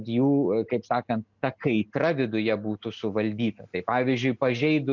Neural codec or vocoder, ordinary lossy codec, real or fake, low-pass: none; MP3, 64 kbps; real; 7.2 kHz